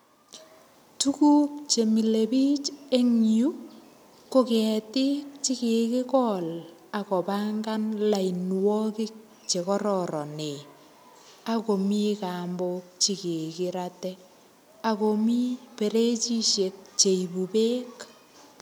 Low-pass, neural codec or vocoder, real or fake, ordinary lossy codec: none; none; real; none